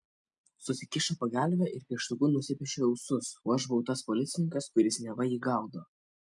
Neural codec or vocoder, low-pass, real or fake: none; 10.8 kHz; real